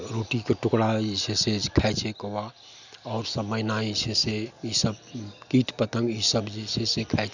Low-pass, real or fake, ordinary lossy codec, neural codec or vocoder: 7.2 kHz; real; none; none